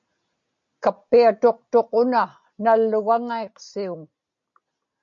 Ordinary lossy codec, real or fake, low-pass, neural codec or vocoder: MP3, 48 kbps; real; 7.2 kHz; none